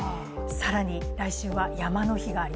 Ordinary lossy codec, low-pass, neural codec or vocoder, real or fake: none; none; none; real